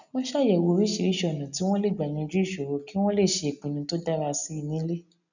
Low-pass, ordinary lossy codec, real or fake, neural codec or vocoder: 7.2 kHz; none; real; none